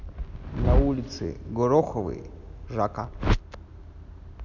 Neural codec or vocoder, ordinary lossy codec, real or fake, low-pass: none; none; real; 7.2 kHz